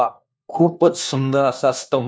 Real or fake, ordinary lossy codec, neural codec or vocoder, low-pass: fake; none; codec, 16 kHz, 1 kbps, FunCodec, trained on LibriTTS, 50 frames a second; none